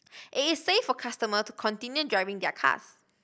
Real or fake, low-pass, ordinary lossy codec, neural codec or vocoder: real; none; none; none